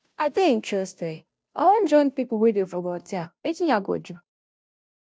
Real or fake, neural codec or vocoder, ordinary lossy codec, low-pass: fake; codec, 16 kHz, 0.5 kbps, FunCodec, trained on Chinese and English, 25 frames a second; none; none